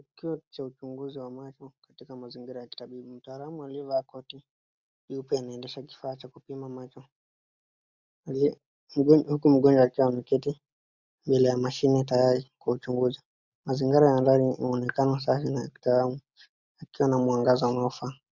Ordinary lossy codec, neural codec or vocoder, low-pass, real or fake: Opus, 64 kbps; none; 7.2 kHz; real